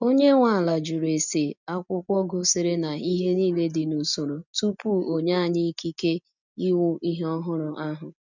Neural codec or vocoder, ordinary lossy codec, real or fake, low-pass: none; none; real; 7.2 kHz